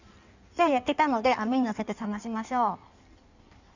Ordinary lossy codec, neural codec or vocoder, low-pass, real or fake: none; codec, 16 kHz in and 24 kHz out, 1.1 kbps, FireRedTTS-2 codec; 7.2 kHz; fake